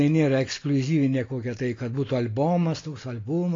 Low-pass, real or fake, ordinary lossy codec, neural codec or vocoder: 7.2 kHz; real; AAC, 32 kbps; none